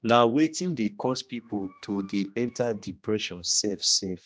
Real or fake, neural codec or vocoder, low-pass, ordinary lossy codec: fake; codec, 16 kHz, 1 kbps, X-Codec, HuBERT features, trained on general audio; none; none